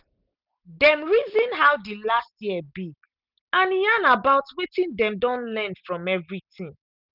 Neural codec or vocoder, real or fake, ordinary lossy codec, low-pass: none; real; none; 5.4 kHz